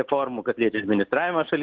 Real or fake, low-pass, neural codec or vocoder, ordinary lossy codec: real; 7.2 kHz; none; Opus, 16 kbps